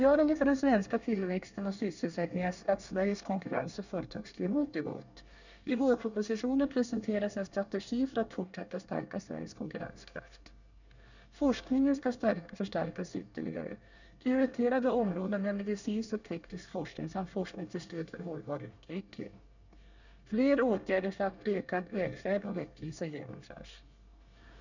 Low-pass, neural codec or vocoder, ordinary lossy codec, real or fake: 7.2 kHz; codec, 24 kHz, 1 kbps, SNAC; none; fake